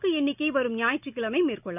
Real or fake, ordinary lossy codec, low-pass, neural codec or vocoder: real; none; 3.6 kHz; none